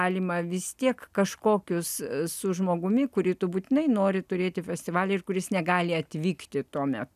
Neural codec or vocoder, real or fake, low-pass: none; real; 14.4 kHz